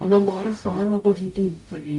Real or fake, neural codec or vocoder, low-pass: fake; codec, 44.1 kHz, 0.9 kbps, DAC; 10.8 kHz